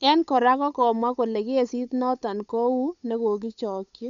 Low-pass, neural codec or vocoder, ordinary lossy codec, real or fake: 7.2 kHz; codec, 16 kHz, 8 kbps, FunCodec, trained on Chinese and English, 25 frames a second; none; fake